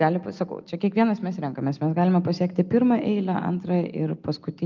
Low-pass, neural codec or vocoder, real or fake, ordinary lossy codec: 7.2 kHz; none; real; Opus, 32 kbps